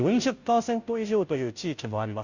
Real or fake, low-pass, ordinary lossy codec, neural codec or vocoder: fake; 7.2 kHz; none; codec, 16 kHz, 0.5 kbps, FunCodec, trained on Chinese and English, 25 frames a second